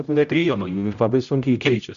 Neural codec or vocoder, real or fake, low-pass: codec, 16 kHz, 0.5 kbps, X-Codec, HuBERT features, trained on general audio; fake; 7.2 kHz